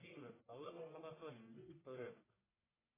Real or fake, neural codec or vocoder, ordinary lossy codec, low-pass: fake; codec, 44.1 kHz, 1.7 kbps, Pupu-Codec; AAC, 16 kbps; 3.6 kHz